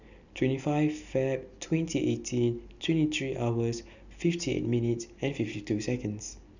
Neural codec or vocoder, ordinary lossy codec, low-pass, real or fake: none; none; 7.2 kHz; real